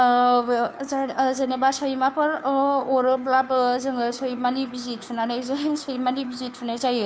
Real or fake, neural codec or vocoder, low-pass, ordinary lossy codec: fake; codec, 16 kHz, 2 kbps, FunCodec, trained on Chinese and English, 25 frames a second; none; none